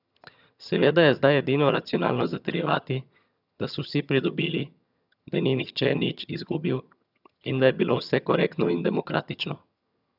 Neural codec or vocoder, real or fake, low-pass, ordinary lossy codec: vocoder, 22.05 kHz, 80 mel bands, HiFi-GAN; fake; 5.4 kHz; none